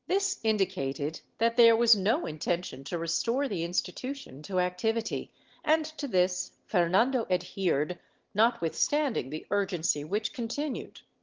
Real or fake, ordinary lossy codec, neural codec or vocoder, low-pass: real; Opus, 16 kbps; none; 7.2 kHz